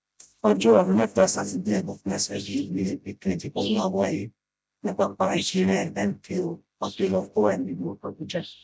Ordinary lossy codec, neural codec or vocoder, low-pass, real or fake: none; codec, 16 kHz, 0.5 kbps, FreqCodec, smaller model; none; fake